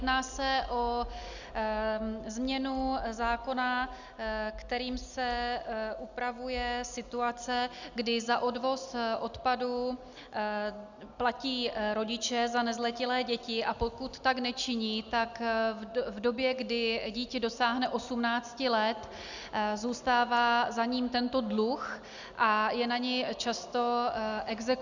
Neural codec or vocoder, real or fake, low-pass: none; real; 7.2 kHz